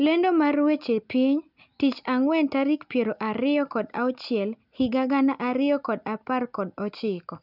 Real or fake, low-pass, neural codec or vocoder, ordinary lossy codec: real; 5.4 kHz; none; none